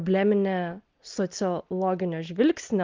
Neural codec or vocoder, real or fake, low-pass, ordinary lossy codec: none; real; 7.2 kHz; Opus, 32 kbps